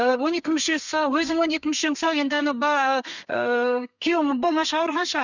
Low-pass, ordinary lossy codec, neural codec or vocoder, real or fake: 7.2 kHz; none; codec, 32 kHz, 1.9 kbps, SNAC; fake